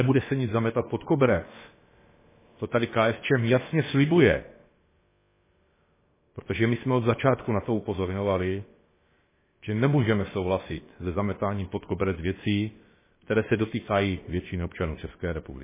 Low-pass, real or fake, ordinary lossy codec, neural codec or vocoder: 3.6 kHz; fake; MP3, 16 kbps; codec, 16 kHz, about 1 kbps, DyCAST, with the encoder's durations